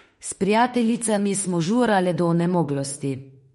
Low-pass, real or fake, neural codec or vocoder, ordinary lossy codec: 19.8 kHz; fake; autoencoder, 48 kHz, 32 numbers a frame, DAC-VAE, trained on Japanese speech; MP3, 48 kbps